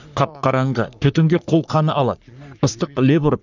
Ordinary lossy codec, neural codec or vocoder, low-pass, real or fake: none; codec, 44.1 kHz, 3.4 kbps, Pupu-Codec; 7.2 kHz; fake